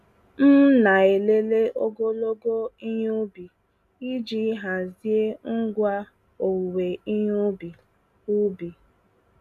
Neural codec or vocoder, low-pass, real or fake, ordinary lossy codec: none; 14.4 kHz; real; none